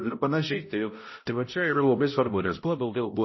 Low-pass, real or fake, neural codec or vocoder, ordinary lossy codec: 7.2 kHz; fake; codec, 16 kHz, 0.5 kbps, X-Codec, HuBERT features, trained on balanced general audio; MP3, 24 kbps